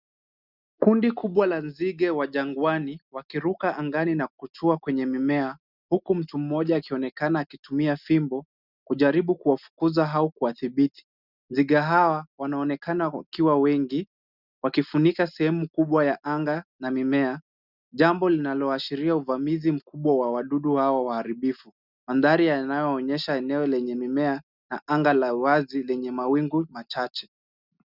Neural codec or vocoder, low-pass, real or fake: none; 5.4 kHz; real